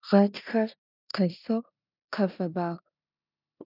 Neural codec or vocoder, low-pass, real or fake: codec, 16 kHz in and 24 kHz out, 0.9 kbps, LongCat-Audio-Codec, four codebook decoder; 5.4 kHz; fake